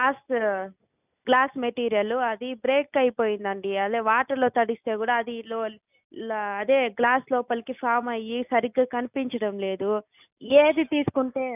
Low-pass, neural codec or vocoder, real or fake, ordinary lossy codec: 3.6 kHz; none; real; none